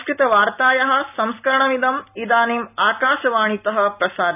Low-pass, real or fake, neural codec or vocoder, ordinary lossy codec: 3.6 kHz; real; none; none